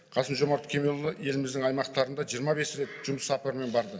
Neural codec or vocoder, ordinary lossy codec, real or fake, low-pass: none; none; real; none